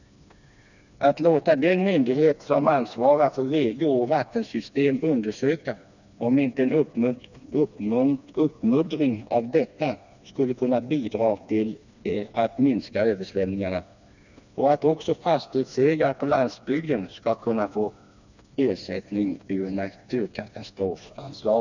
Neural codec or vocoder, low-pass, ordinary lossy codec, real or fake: codec, 16 kHz, 2 kbps, FreqCodec, smaller model; 7.2 kHz; none; fake